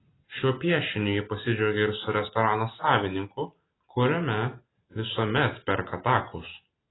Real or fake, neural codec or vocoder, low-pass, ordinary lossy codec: real; none; 7.2 kHz; AAC, 16 kbps